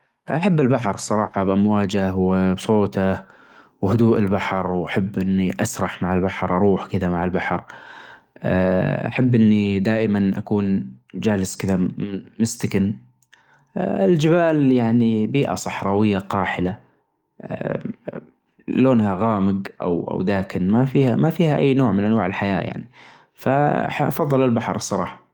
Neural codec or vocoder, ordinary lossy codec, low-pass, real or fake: codec, 44.1 kHz, 7.8 kbps, DAC; Opus, 32 kbps; 19.8 kHz; fake